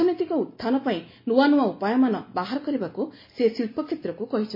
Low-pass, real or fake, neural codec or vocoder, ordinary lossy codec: 5.4 kHz; real; none; MP3, 24 kbps